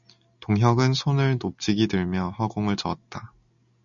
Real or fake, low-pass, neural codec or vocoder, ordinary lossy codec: real; 7.2 kHz; none; MP3, 96 kbps